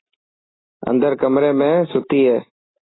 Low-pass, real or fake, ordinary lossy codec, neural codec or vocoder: 7.2 kHz; real; AAC, 16 kbps; none